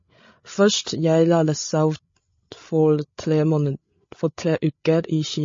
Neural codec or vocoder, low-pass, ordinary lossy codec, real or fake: codec, 16 kHz, 8 kbps, FreqCodec, larger model; 7.2 kHz; MP3, 32 kbps; fake